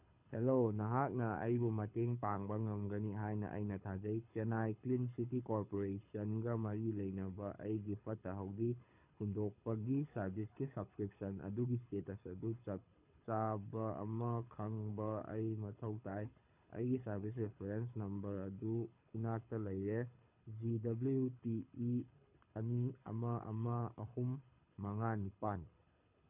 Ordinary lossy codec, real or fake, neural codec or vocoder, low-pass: none; fake; codec, 24 kHz, 6 kbps, HILCodec; 3.6 kHz